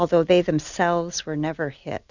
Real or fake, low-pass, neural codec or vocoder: real; 7.2 kHz; none